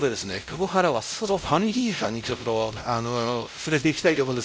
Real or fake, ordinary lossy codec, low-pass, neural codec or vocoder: fake; none; none; codec, 16 kHz, 0.5 kbps, X-Codec, WavLM features, trained on Multilingual LibriSpeech